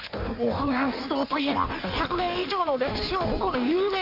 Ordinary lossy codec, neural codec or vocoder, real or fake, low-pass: none; codec, 16 kHz in and 24 kHz out, 1.1 kbps, FireRedTTS-2 codec; fake; 5.4 kHz